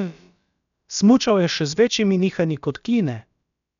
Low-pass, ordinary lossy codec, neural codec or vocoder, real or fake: 7.2 kHz; none; codec, 16 kHz, about 1 kbps, DyCAST, with the encoder's durations; fake